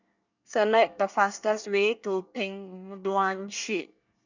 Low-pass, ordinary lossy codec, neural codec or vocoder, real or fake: 7.2 kHz; none; codec, 24 kHz, 1 kbps, SNAC; fake